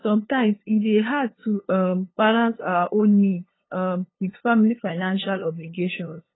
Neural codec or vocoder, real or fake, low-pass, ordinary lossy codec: codec, 16 kHz, 2 kbps, FunCodec, trained on LibriTTS, 25 frames a second; fake; 7.2 kHz; AAC, 16 kbps